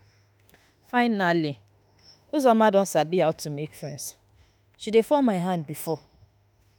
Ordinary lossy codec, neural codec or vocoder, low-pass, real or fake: none; autoencoder, 48 kHz, 32 numbers a frame, DAC-VAE, trained on Japanese speech; none; fake